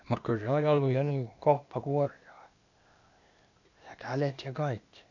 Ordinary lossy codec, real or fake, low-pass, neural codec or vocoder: none; fake; 7.2 kHz; codec, 16 kHz, 0.8 kbps, ZipCodec